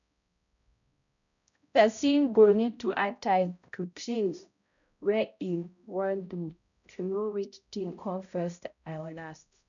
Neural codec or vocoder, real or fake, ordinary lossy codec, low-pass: codec, 16 kHz, 0.5 kbps, X-Codec, HuBERT features, trained on balanced general audio; fake; none; 7.2 kHz